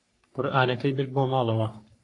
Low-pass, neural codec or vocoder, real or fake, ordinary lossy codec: 10.8 kHz; codec, 44.1 kHz, 3.4 kbps, Pupu-Codec; fake; AAC, 48 kbps